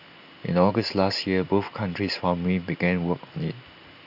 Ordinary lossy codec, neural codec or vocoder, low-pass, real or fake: none; none; 5.4 kHz; real